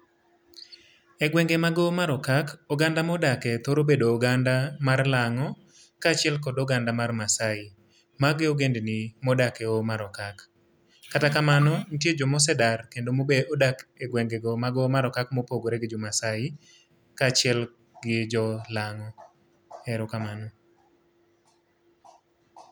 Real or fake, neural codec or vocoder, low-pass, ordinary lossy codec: real; none; none; none